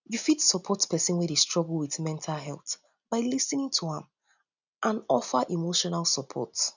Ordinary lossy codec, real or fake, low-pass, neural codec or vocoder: none; real; 7.2 kHz; none